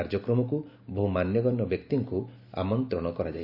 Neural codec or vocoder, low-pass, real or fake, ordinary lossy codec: none; 5.4 kHz; real; none